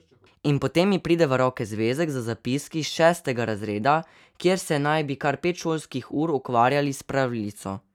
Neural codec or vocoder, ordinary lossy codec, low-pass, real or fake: none; none; 19.8 kHz; real